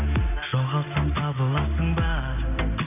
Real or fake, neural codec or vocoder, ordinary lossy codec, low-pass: real; none; none; 3.6 kHz